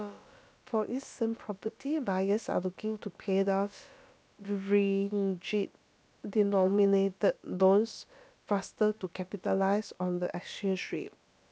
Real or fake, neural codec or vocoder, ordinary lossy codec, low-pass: fake; codec, 16 kHz, about 1 kbps, DyCAST, with the encoder's durations; none; none